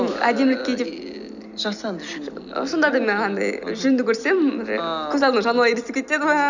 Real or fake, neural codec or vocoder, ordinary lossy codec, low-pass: real; none; none; 7.2 kHz